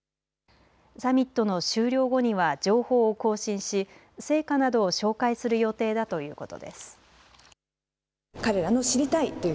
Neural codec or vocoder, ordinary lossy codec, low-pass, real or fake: none; none; none; real